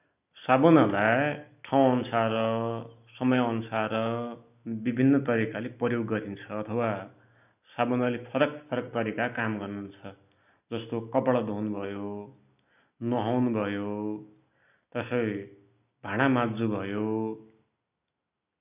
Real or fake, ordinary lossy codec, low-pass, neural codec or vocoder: real; none; 3.6 kHz; none